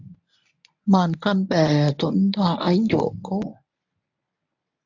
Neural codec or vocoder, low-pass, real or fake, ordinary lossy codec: codec, 24 kHz, 0.9 kbps, WavTokenizer, medium speech release version 1; 7.2 kHz; fake; AAC, 48 kbps